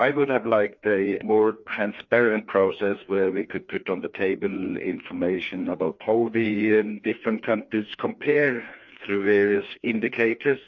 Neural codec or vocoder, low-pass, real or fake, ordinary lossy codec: codec, 16 kHz, 2 kbps, FreqCodec, larger model; 7.2 kHz; fake; MP3, 48 kbps